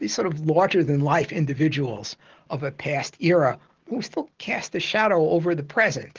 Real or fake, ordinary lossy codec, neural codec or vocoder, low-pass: real; Opus, 16 kbps; none; 7.2 kHz